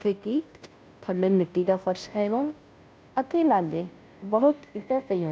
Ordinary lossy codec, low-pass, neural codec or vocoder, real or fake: none; none; codec, 16 kHz, 0.5 kbps, FunCodec, trained on Chinese and English, 25 frames a second; fake